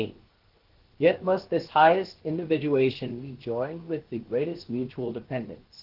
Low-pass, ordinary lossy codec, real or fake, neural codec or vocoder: 5.4 kHz; Opus, 16 kbps; fake; codec, 16 kHz, 0.7 kbps, FocalCodec